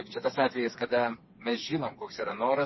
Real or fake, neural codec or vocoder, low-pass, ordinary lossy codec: fake; codec, 16 kHz, 4 kbps, FreqCodec, smaller model; 7.2 kHz; MP3, 24 kbps